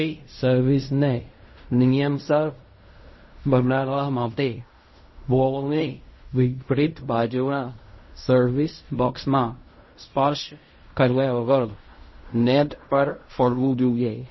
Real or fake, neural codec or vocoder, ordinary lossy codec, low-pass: fake; codec, 16 kHz in and 24 kHz out, 0.4 kbps, LongCat-Audio-Codec, fine tuned four codebook decoder; MP3, 24 kbps; 7.2 kHz